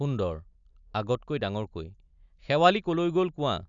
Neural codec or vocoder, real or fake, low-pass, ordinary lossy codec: none; real; 7.2 kHz; none